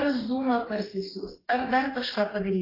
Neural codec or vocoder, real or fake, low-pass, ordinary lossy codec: codec, 44.1 kHz, 2.6 kbps, DAC; fake; 5.4 kHz; AAC, 24 kbps